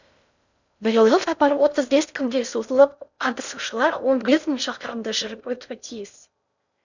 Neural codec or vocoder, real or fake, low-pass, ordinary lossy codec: codec, 16 kHz in and 24 kHz out, 0.6 kbps, FocalCodec, streaming, 4096 codes; fake; 7.2 kHz; none